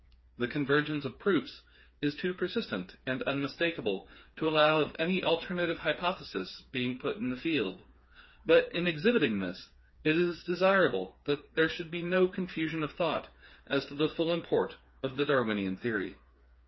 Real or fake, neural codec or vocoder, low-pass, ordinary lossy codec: fake; codec, 16 kHz, 4 kbps, FreqCodec, smaller model; 7.2 kHz; MP3, 24 kbps